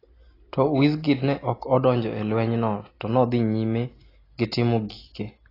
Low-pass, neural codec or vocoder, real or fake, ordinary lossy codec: 5.4 kHz; none; real; AAC, 24 kbps